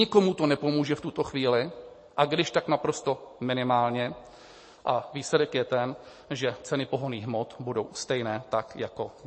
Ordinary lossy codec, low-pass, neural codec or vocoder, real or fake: MP3, 32 kbps; 9.9 kHz; none; real